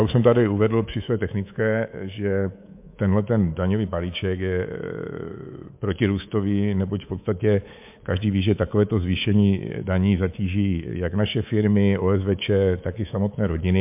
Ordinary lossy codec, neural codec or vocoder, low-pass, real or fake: MP3, 32 kbps; codec, 24 kHz, 3.1 kbps, DualCodec; 3.6 kHz; fake